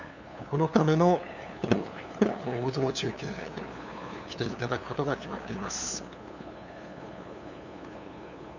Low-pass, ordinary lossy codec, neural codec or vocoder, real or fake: 7.2 kHz; none; codec, 16 kHz, 2 kbps, FunCodec, trained on LibriTTS, 25 frames a second; fake